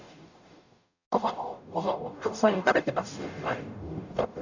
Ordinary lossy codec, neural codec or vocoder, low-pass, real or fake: none; codec, 44.1 kHz, 0.9 kbps, DAC; 7.2 kHz; fake